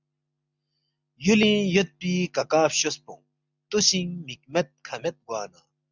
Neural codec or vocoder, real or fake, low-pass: none; real; 7.2 kHz